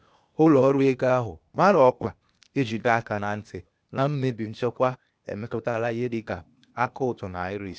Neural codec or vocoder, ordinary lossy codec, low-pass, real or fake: codec, 16 kHz, 0.8 kbps, ZipCodec; none; none; fake